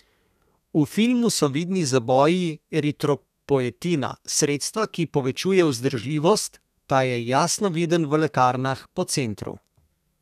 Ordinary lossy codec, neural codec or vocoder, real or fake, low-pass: none; codec, 32 kHz, 1.9 kbps, SNAC; fake; 14.4 kHz